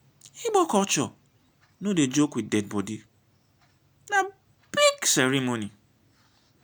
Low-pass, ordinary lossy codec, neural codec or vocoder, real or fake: none; none; none; real